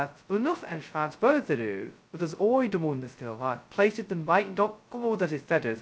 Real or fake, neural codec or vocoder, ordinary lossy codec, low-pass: fake; codec, 16 kHz, 0.2 kbps, FocalCodec; none; none